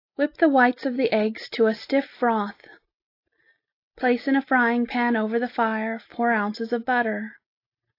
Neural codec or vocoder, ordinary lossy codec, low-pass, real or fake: none; AAC, 32 kbps; 5.4 kHz; real